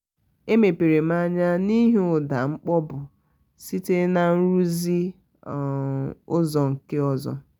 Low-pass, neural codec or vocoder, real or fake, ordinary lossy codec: none; none; real; none